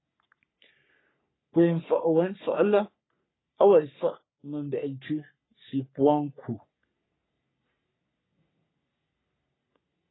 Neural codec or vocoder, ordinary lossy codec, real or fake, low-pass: codec, 44.1 kHz, 3.4 kbps, Pupu-Codec; AAC, 16 kbps; fake; 7.2 kHz